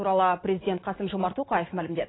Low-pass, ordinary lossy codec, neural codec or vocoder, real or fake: 7.2 kHz; AAC, 16 kbps; none; real